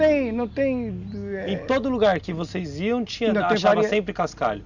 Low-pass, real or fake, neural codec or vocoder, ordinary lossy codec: 7.2 kHz; real; none; none